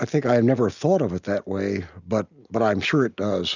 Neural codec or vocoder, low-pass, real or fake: none; 7.2 kHz; real